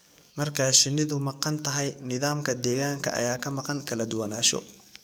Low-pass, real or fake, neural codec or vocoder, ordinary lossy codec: none; fake; codec, 44.1 kHz, 7.8 kbps, DAC; none